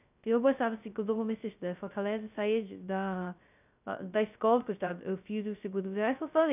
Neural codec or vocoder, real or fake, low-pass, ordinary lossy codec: codec, 16 kHz, 0.2 kbps, FocalCodec; fake; 3.6 kHz; none